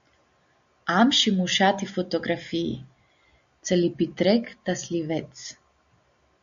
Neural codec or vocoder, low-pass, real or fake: none; 7.2 kHz; real